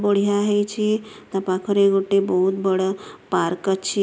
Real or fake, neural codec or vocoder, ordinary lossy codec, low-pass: real; none; none; none